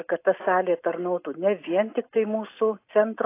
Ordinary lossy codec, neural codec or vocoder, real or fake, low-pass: AAC, 24 kbps; none; real; 3.6 kHz